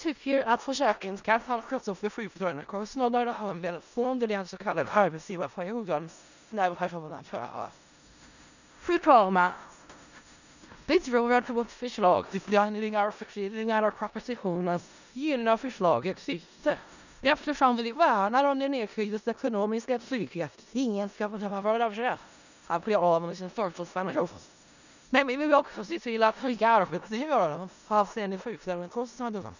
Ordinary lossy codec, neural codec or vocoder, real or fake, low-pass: none; codec, 16 kHz in and 24 kHz out, 0.4 kbps, LongCat-Audio-Codec, four codebook decoder; fake; 7.2 kHz